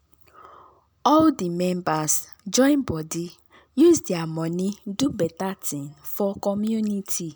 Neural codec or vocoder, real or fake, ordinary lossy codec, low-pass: none; real; none; none